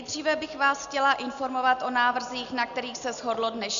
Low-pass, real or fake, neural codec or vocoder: 7.2 kHz; real; none